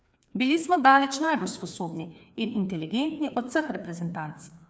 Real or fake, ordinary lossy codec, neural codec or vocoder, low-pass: fake; none; codec, 16 kHz, 2 kbps, FreqCodec, larger model; none